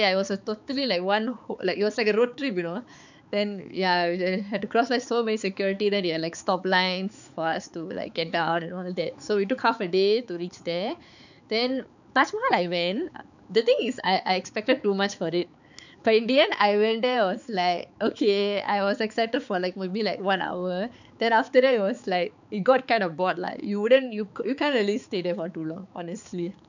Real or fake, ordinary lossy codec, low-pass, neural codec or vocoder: fake; none; 7.2 kHz; codec, 16 kHz, 4 kbps, X-Codec, HuBERT features, trained on balanced general audio